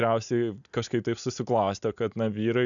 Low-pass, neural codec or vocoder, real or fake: 7.2 kHz; none; real